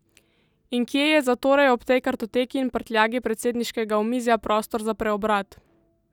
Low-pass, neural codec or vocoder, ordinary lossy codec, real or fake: 19.8 kHz; none; none; real